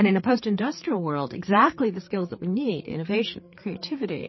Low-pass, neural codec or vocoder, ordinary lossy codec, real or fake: 7.2 kHz; codec, 16 kHz, 4 kbps, FreqCodec, larger model; MP3, 24 kbps; fake